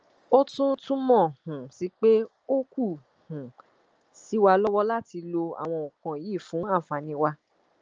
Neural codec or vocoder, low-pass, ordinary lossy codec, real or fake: none; 7.2 kHz; Opus, 24 kbps; real